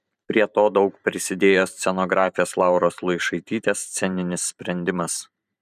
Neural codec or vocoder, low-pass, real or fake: vocoder, 44.1 kHz, 128 mel bands every 512 samples, BigVGAN v2; 14.4 kHz; fake